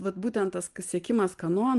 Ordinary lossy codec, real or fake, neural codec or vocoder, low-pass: Opus, 24 kbps; real; none; 10.8 kHz